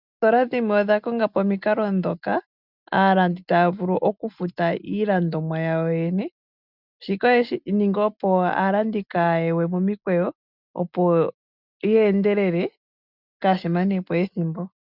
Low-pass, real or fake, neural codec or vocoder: 5.4 kHz; real; none